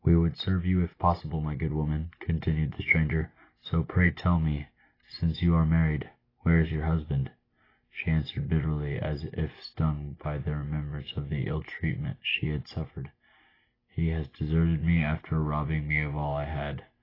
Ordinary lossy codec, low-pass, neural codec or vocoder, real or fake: AAC, 24 kbps; 5.4 kHz; none; real